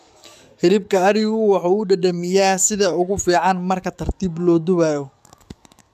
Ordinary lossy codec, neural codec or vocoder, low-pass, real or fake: none; codec, 44.1 kHz, 7.8 kbps, DAC; 14.4 kHz; fake